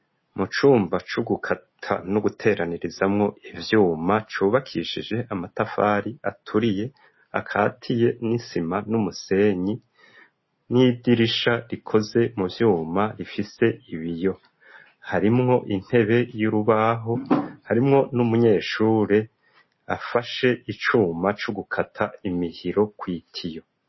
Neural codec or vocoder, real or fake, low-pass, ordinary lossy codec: none; real; 7.2 kHz; MP3, 24 kbps